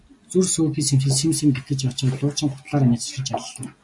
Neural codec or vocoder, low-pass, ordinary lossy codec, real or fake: none; 10.8 kHz; MP3, 64 kbps; real